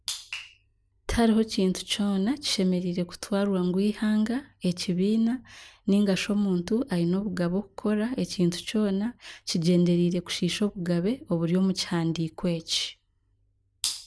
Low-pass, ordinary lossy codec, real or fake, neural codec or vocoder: none; none; real; none